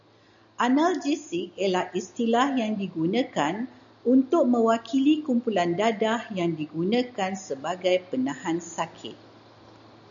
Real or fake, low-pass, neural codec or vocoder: real; 7.2 kHz; none